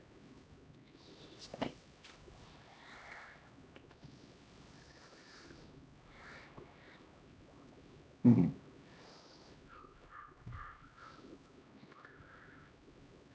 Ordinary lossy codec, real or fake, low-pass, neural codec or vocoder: none; fake; none; codec, 16 kHz, 1 kbps, X-Codec, HuBERT features, trained on LibriSpeech